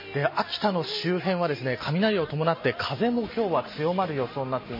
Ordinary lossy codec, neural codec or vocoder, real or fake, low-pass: MP3, 24 kbps; none; real; 5.4 kHz